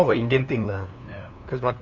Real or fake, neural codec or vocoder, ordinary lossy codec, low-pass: fake; codec, 16 kHz, 2 kbps, FunCodec, trained on LibriTTS, 25 frames a second; none; 7.2 kHz